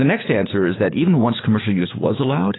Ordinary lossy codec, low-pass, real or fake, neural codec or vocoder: AAC, 16 kbps; 7.2 kHz; fake; codec, 16 kHz, 6 kbps, DAC